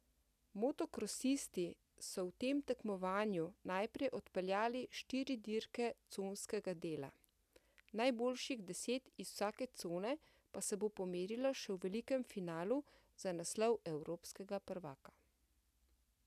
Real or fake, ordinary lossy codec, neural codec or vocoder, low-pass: real; none; none; 14.4 kHz